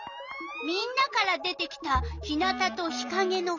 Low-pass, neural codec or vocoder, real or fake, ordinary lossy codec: 7.2 kHz; none; real; none